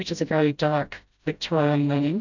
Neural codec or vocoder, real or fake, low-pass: codec, 16 kHz, 0.5 kbps, FreqCodec, smaller model; fake; 7.2 kHz